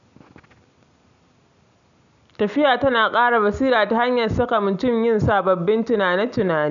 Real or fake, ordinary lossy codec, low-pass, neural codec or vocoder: real; none; 7.2 kHz; none